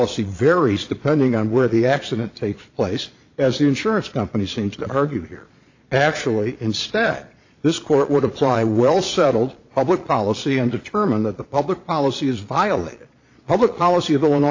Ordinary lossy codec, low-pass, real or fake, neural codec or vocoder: AAC, 48 kbps; 7.2 kHz; fake; vocoder, 22.05 kHz, 80 mel bands, Vocos